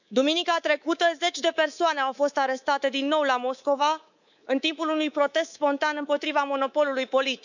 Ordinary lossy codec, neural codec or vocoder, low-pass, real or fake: none; codec, 24 kHz, 3.1 kbps, DualCodec; 7.2 kHz; fake